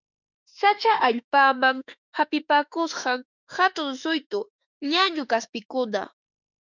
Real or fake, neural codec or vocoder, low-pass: fake; autoencoder, 48 kHz, 32 numbers a frame, DAC-VAE, trained on Japanese speech; 7.2 kHz